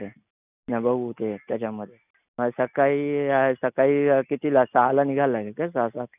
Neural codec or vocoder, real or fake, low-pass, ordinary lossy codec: none; real; 3.6 kHz; none